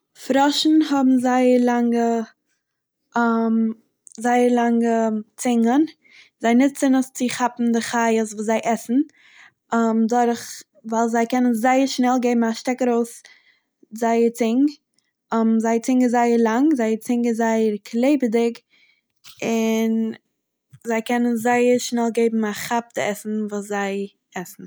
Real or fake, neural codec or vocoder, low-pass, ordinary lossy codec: real; none; none; none